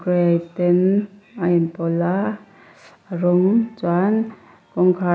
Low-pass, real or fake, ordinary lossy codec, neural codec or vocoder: none; real; none; none